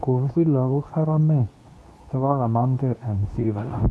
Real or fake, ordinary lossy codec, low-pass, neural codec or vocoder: fake; none; none; codec, 24 kHz, 0.9 kbps, WavTokenizer, medium speech release version 2